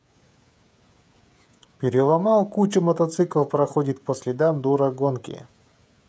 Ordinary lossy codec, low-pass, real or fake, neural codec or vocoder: none; none; fake; codec, 16 kHz, 16 kbps, FreqCodec, smaller model